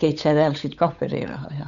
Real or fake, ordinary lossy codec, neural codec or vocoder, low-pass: fake; none; codec, 16 kHz, 8 kbps, FunCodec, trained on Chinese and English, 25 frames a second; 7.2 kHz